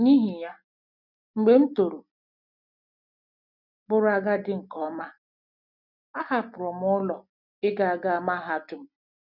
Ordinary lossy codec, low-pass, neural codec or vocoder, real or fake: none; 5.4 kHz; none; real